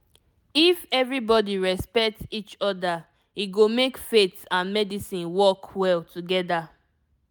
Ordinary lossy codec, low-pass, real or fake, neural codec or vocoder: none; none; real; none